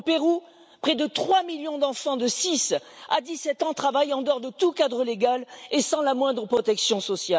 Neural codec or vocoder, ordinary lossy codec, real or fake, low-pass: none; none; real; none